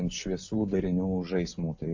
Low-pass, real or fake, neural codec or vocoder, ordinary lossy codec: 7.2 kHz; real; none; AAC, 48 kbps